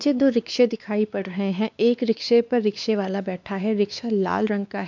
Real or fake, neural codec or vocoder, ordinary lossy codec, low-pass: fake; codec, 16 kHz, 2 kbps, X-Codec, WavLM features, trained on Multilingual LibriSpeech; none; 7.2 kHz